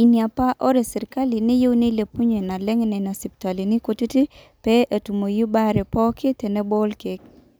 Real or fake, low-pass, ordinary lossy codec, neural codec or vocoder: real; none; none; none